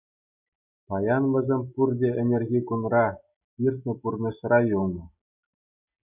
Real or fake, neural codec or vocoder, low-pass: real; none; 3.6 kHz